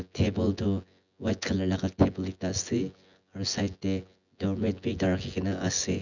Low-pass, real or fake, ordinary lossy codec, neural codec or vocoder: 7.2 kHz; fake; none; vocoder, 24 kHz, 100 mel bands, Vocos